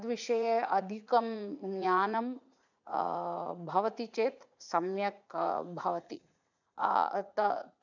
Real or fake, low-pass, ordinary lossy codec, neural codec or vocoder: fake; 7.2 kHz; none; vocoder, 44.1 kHz, 80 mel bands, Vocos